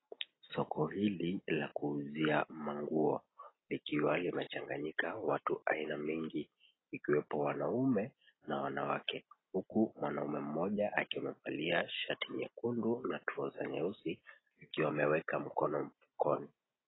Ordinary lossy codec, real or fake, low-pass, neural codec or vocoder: AAC, 16 kbps; real; 7.2 kHz; none